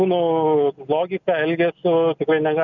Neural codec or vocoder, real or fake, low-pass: none; real; 7.2 kHz